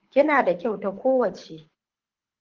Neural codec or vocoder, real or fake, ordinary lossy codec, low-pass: codec, 24 kHz, 6 kbps, HILCodec; fake; Opus, 16 kbps; 7.2 kHz